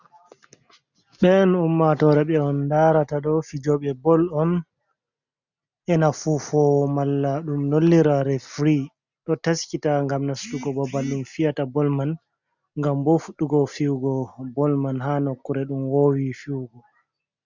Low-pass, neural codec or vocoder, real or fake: 7.2 kHz; none; real